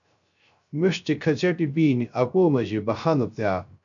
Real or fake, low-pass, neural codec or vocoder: fake; 7.2 kHz; codec, 16 kHz, 0.3 kbps, FocalCodec